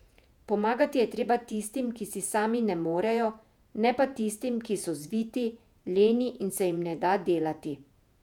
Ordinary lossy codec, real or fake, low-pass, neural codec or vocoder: none; fake; 19.8 kHz; vocoder, 48 kHz, 128 mel bands, Vocos